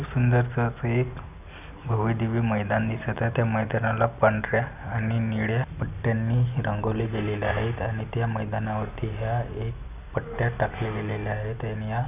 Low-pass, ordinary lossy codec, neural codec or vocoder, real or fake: 3.6 kHz; none; none; real